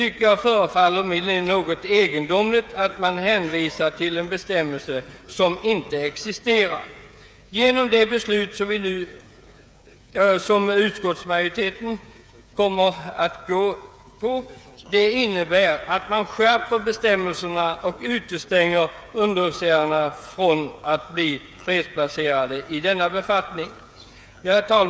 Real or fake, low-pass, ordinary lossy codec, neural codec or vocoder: fake; none; none; codec, 16 kHz, 8 kbps, FreqCodec, smaller model